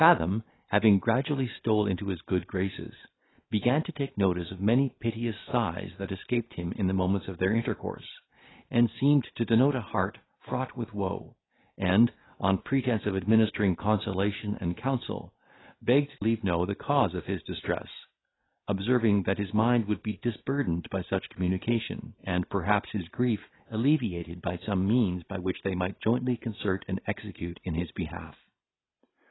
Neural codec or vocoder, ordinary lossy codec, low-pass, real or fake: none; AAC, 16 kbps; 7.2 kHz; real